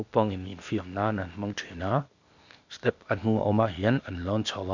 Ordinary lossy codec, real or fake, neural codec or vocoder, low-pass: Opus, 64 kbps; fake; codec, 16 kHz, 0.8 kbps, ZipCodec; 7.2 kHz